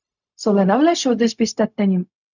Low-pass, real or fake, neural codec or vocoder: 7.2 kHz; fake; codec, 16 kHz, 0.4 kbps, LongCat-Audio-Codec